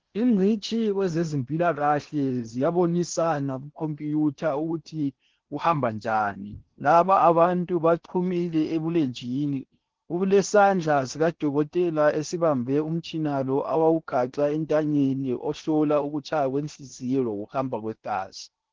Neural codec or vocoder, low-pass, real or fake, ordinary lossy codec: codec, 16 kHz in and 24 kHz out, 0.8 kbps, FocalCodec, streaming, 65536 codes; 7.2 kHz; fake; Opus, 16 kbps